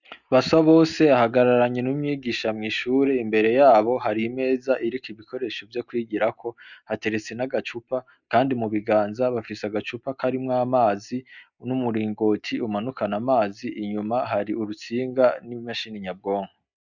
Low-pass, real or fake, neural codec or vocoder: 7.2 kHz; real; none